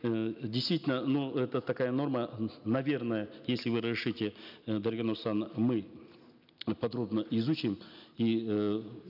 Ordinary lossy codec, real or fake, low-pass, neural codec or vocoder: none; real; 5.4 kHz; none